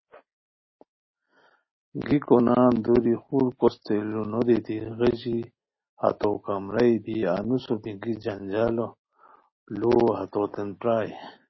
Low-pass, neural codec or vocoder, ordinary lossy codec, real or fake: 7.2 kHz; none; MP3, 24 kbps; real